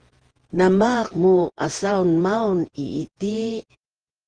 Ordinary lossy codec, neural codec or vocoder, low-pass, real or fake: Opus, 16 kbps; vocoder, 48 kHz, 128 mel bands, Vocos; 9.9 kHz; fake